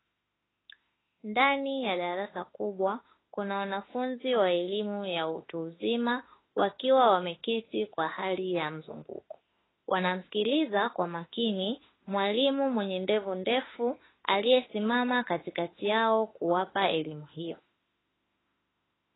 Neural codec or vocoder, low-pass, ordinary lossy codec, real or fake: autoencoder, 48 kHz, 32 numbers a frame, DAC-VAE, trained on Japanese speech; 7.2 kHz; AAC, 16 kbps; fake